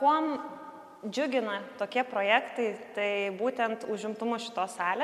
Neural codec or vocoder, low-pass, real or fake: none; 14.4 kHz; real